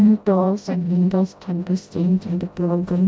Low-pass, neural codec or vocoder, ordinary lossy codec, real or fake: none; codec, 16 kHz, 0.5 kbps, FreqCodec, smaller model; none; fake